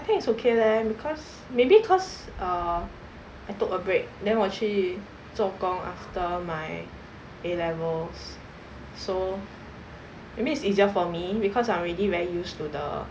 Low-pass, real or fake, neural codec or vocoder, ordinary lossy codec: none; real; none; none